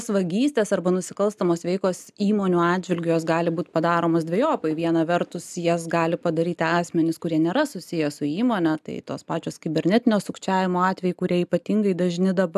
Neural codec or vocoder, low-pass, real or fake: none; 14.4 kHz; real